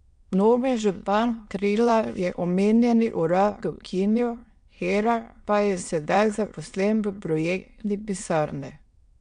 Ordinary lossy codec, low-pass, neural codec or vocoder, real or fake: MP3, 64 kbps; 9.9 kHz; autoencoder, 22.05 kHz, a latent of 192 numbers a frame, VITS, trained on many speakers; fake